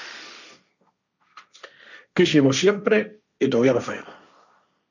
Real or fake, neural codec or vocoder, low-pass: fake; codec, 16 kHz, 1.1 kbps, Voila-Tokenizer; 7.2 kHz